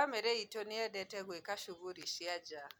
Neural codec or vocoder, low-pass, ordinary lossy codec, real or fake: none; none; none; real